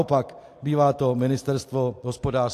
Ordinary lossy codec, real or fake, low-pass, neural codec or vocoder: AAC, 64 kbps; real; 14.4 kHz; none